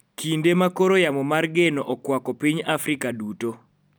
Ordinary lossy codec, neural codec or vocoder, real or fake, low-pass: none; none; real; none